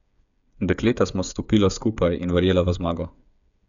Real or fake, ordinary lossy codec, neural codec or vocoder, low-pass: fake; none; codec, 16 kHz, 8 kbps, FreqCodec, smaller model; 7.2 kHz